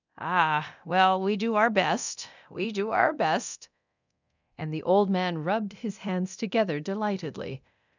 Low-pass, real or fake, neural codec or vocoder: 7.2 kHz; fake; codec, 24 kHz, 0.9 kbps, DualCodec